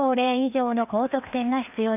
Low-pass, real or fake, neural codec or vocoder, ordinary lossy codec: 3.6 kHz; fake; codec, 16 kHz, 2 kbps, FreqCodec, larger model; none